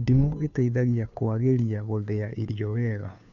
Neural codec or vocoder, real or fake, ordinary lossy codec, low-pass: codec, 16 kHz, 2 kbps, FunCodec, trained on Chinese and English, 25 frames a second; fake; none; 7.2 kHz